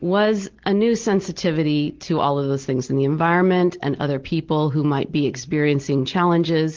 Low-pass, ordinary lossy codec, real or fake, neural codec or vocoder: 7.2 kHz; Opus, 16 kbps; real; none